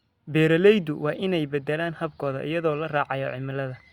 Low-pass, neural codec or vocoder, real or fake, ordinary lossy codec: 19.8 kHz; none; real; Opus, 64 kbps